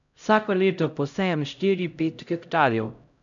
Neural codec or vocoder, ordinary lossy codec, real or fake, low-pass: codec, 16 kHz, 0.5 kbps, X-Codec, HuBERT features, trained on LibriSpeech; none; fake; 7.2 kHz